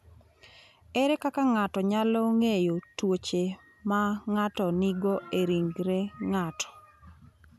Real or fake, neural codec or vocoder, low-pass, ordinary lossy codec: real; none; 14.4 kHz; none